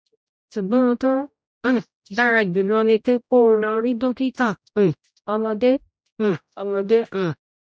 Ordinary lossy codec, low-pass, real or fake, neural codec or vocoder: none; none; fake; codec, 16 kHz, 0.5 kbps, X-Codec, HuBERT features, trained on general audio